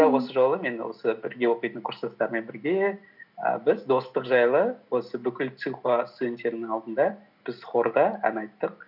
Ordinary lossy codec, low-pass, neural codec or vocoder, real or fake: none; 5.4 kHz; none; real